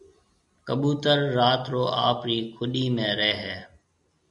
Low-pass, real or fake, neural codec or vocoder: 10.8 kHz; real; none